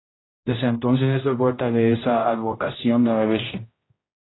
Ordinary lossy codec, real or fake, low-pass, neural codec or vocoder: AAC, 16 kbps; fake; 7.2 kHz; codec, 16 kHz, 0.5 kbps, X-Codec, HuBERT features, trained on general audio